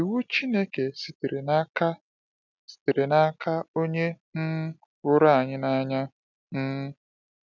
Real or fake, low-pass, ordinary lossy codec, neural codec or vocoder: real; 7.2 kHz; none; none